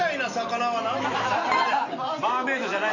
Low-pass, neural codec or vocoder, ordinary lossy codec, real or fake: 7.2 kHz; none; none; real